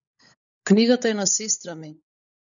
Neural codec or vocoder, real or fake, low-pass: codec, 16 kHz, 16 kbps, FunCodec, trained on LibriTTS, 50 frames a second; fake; 7.2 kHz